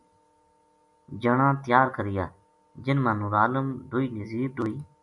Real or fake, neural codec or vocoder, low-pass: real; none; 10.8 kHz